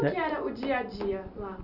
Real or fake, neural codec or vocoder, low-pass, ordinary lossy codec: real; none; 5.4 kHz; none